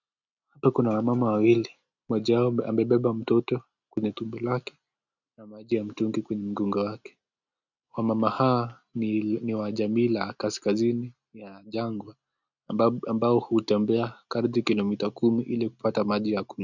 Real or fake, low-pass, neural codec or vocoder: real; 7.2 kHz; none